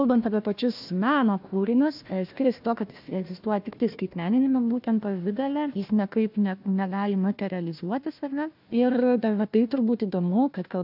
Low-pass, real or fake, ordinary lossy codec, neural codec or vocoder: 5.4 kHz; fake; AAC, 48 kbps; codec, 16 kHz, 1 kbps, FunCodec, trained on Chinese and English, 50 frames a second